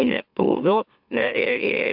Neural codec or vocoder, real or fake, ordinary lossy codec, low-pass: autoencoder, 44.1 kHz, a latent of 192 numbers a frame, MeloTTS; fake; AAC, 48 kbps; 5.4 kHz